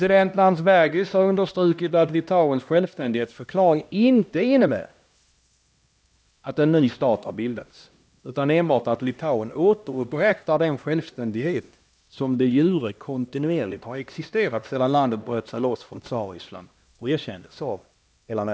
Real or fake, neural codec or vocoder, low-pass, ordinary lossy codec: fake; codec, 16 kHz, 1 kbps, X-Codec, HuBERT features, trained on LibriSpeech; none; none